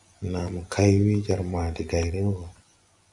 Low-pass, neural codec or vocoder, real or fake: 10.8 kHz; vocoder, 24 kHz, 100 mel bands, Vocos; fake